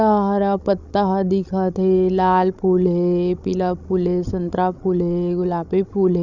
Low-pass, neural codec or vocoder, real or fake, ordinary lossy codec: 7.2 kHz; codec, 16 kHz, 16 kbps, FreqCodec, larger model; fake; none